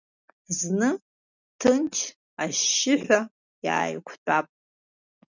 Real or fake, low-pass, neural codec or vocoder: real; 7.2 kHz; none